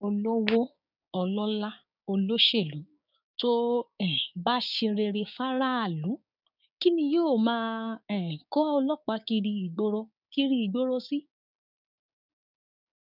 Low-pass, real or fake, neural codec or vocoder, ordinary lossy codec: 5.4 kHz; fake; codec, 16 kHz, 6 kbps, DAC; none